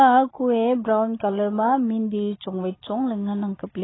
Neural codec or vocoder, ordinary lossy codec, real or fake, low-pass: none; AAC, 16 kbps; real; 7.2 kHz